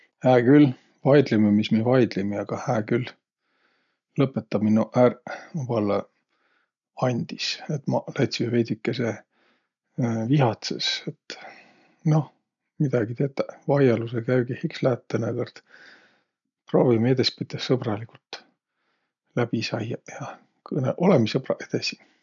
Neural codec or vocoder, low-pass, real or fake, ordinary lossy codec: none; 7.2 kHz; real; none